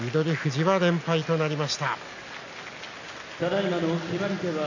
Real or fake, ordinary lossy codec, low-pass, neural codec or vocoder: real; none; 7.2 kHz; none